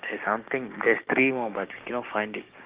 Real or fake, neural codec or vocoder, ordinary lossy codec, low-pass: fake; codec, 16 kHz, 6 kbps, DAC; Opus, 32 kbps; 3.6 kHz